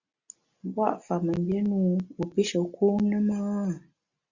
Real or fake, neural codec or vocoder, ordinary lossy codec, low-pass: real; none; Opus, 64 kbps; 7.2 kHz